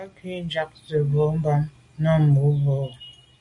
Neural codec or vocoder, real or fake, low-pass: none; real; 10.8 kHz